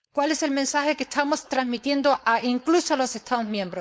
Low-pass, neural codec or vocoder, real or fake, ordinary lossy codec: none; codec, 16 kHz, 4.8 kbps, FACodec; fake; none